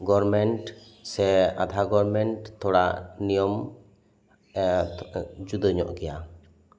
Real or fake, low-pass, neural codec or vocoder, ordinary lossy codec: real; none; none; none